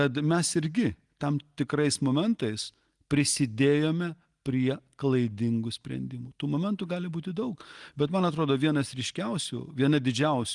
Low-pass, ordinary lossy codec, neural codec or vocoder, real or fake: 10.8 kHz; Opus, 24 kbps; none; real